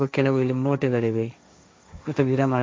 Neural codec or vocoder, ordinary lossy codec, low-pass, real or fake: codec, 16 kHz, 1.1 kbps, Voila-Tokenizer; none; none; fake